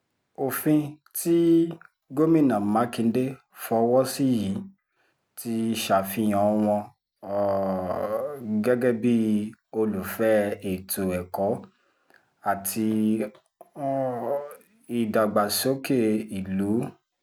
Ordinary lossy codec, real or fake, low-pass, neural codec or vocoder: none; real; none; none